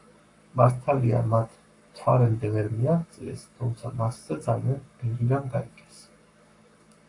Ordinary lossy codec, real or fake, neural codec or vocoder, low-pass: AAC, 48 kbps; fake; codec, 44.1 kHz, 7.8 kbps, Pupu-Codec; 10.8 kHz